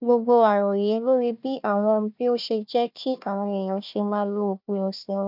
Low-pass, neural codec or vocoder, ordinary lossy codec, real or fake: 5.4 kHz; codec, 16 kHz, 1 kbps, FunCodec, trained on Chinese and English, 50 frames a second; none; fake